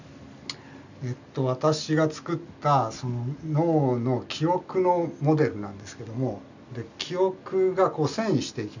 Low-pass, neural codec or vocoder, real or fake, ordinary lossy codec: 7.2 kHz; none; real; none